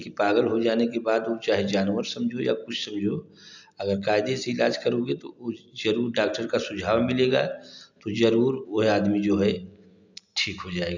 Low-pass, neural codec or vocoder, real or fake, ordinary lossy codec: 7.2 kHz; none; real; none